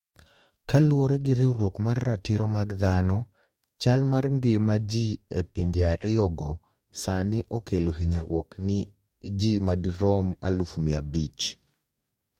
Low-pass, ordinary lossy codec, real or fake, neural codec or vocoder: 19.8 kHz; MP3, 64 kbps; fake; codec, 44.1 kHz, 2.6 kbps, DAC